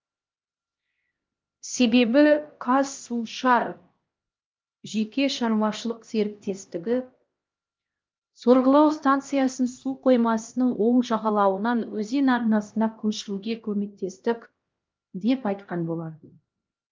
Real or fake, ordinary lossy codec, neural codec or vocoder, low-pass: fake; Opus, 24 kbps; codec, 16 kHz, 1 kbps, X-Codec, HuBERT features, trained on LibriSpeech; 7.2 kHz